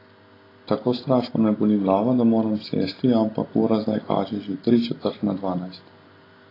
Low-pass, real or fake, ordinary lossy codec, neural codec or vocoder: 5.4 kHz; real; AAC, 24 kbps; none